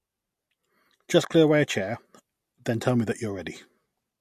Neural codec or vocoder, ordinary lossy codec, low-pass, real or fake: none; MP3, 64 kbps; 14.4 kHz; real